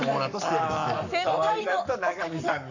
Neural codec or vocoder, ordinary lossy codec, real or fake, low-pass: codec, 44.1 kHz, 7.8 kbps, Pupu-Codec; none; fake; 7.2 kHz